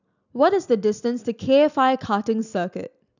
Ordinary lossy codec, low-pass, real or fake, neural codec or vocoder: none; 7.2 kHz; real; none